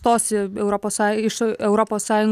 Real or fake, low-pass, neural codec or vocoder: real; 14.4 kHz; none